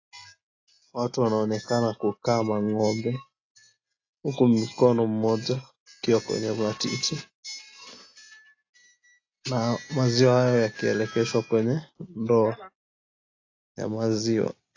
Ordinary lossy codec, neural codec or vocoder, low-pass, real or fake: AAC, 32 kbps; none; 7.2 kHz; real